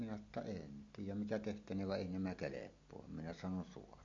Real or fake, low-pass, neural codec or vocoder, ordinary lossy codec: real; 7.2 kHz; none; MP3, 48 kbps